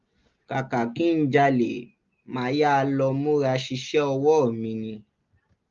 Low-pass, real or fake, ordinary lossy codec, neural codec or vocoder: 7.2 kHz; real; Opus, 32 kbps; none